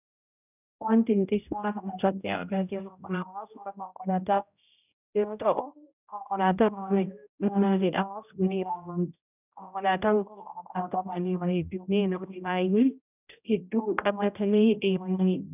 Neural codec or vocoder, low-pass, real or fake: codec, 16 kHz, 0.5 kbps, X-Codec, HuBERT features, trained on general audio; 3.6 kHz; fake